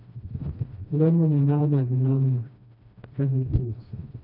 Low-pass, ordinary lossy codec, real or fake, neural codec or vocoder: 5.4 kHz; none; fake; codec, 16 kHz, 1 kbps, FreqCodec, smaller model